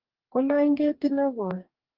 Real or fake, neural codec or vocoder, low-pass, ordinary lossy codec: fake; codec, 44.1 kHz, 2.6 kbps, DAC; 5.4 kHz; Opus, 32 kbps